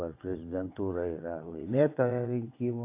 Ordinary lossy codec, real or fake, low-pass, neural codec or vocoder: AAC, 24 kbps; fake; 3.6 kHz; vocoder, 22.05 kHz, 80 mel bands, WaveNeXt